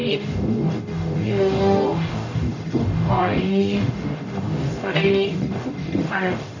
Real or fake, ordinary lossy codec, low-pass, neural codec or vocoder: fake; none; 7.2 kHz; codec, 44.1 kHz, 0.9 kbps, DAC